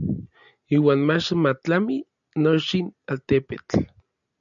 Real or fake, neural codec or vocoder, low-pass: real; none; 7.2 kHz